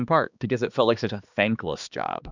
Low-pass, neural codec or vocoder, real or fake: 7.2 kHz; codec, 16 kHz, 2 kbps, X-Codec, HuBERT features, trained on balanced general audio; fake